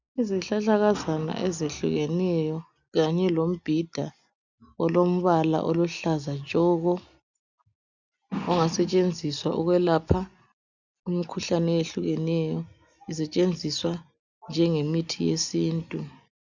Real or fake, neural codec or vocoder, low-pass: real; none; 7.2 kHz